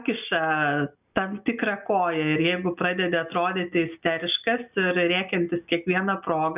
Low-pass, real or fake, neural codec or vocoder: 3.6 kHz; real; none